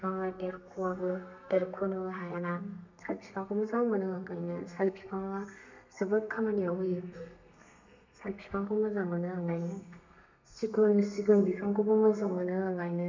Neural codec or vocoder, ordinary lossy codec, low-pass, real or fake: codec, 32 kHz, 1.9 kbps, SNAC; MP3, 64 kbps; 7.2 kHz; fake